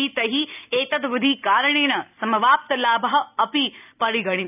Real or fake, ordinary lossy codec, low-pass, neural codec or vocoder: real; none; 3.6 kHz; none